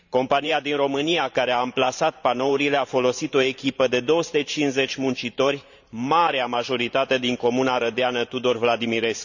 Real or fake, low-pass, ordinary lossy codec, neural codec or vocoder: fake; 7.2 kHz; none; vocoder, 44.1 kHz, 128 mel bands every 512 samples, BigVGAN v2